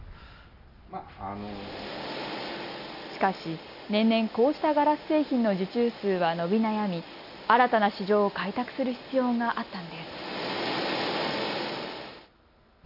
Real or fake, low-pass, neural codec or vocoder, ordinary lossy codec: real; 5.4 kHz; none; none